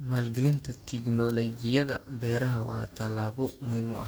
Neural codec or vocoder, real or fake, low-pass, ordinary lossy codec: codec, 44.1 kHz, 2.6 kbps, DAC; fake; none; none